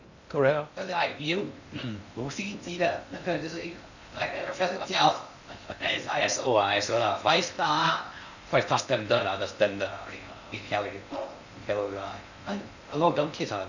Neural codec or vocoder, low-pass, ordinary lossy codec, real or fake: codec, 16 kHz in and 24 kHz out, 0.6 kbps, FocalCodec, streaming, 2048 codes; 7.2 kHz; none; fake